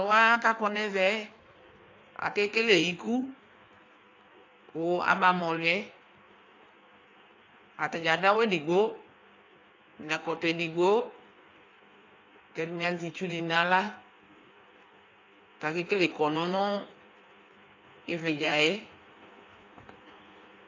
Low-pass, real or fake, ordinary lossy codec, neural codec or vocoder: 7.2 kHz; fake; AAC, 48 kbps; codec, 16 kHz in and 24 kHz out, 1.1 kbps, FireRedTTS-2 codec